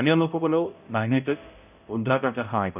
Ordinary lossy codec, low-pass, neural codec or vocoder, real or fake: none; 3.6 kHz; codec, 16 kHz, 0.5 kbps, X-Codec, HuBERT features, trained on balanced general audio; fake